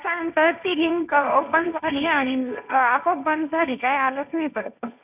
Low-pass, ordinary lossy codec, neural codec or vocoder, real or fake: 3.6 kHz; none; codec, 16 kHz, 1.1 kbps, Voila-Tokenizer; fake